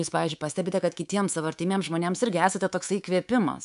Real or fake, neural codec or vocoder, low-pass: fake; codec, 24 kHz, 3.1 kbps, DualCodec; 10.8 kHz